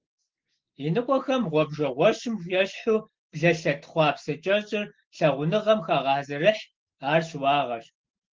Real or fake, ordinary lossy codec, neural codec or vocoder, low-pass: real; Opus, 16 kbps; none; 7.2 kHz